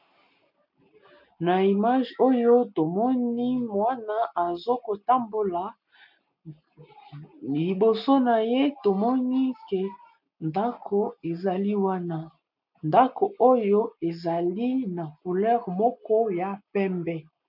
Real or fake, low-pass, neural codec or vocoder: real; 5.4 kHz; none